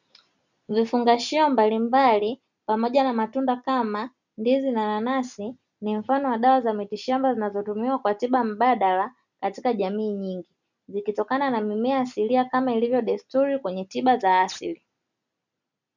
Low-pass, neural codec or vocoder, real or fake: 7.2 kHz; none; real